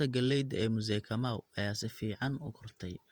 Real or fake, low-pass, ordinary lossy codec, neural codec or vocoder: fake; 19.8 kHz; Opus, 32 kbps; vocoder, 48 kHz, 128 mel bands, Vocos